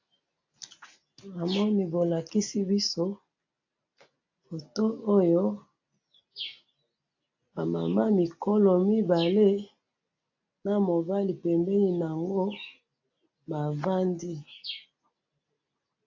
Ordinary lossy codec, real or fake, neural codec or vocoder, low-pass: AAC, 48 kbps; real; none; 7.2 kHz